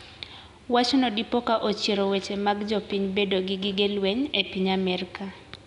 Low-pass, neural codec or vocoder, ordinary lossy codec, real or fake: 10.8 kHz; none; none; real